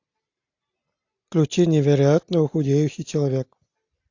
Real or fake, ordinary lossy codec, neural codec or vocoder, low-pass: real; AAC, 48 kbps; none; 7.2 kHz